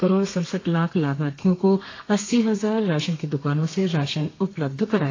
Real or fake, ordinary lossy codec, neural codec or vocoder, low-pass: fake; AAC, 32 kbps; codec, 32 kHz, 1.9 kbps, SNAC; 7.2 kHz